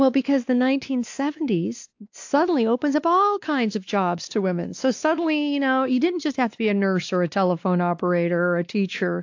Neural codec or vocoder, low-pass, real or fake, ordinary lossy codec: codec, 16 kHz, 2 kbps, X-Codec, WavLM features, trained on Multilingual LibriSpeech; 7.2 kHz; fake; AAC, 48 kbps